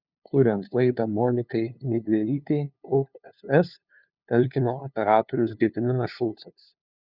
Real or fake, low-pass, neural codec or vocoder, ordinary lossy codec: fake; 5.4 kHz; codec, 16 kHz, 2 kbps, FunCodec, trained on LibriTTS, 25 frames a second; Opus, 64 kbps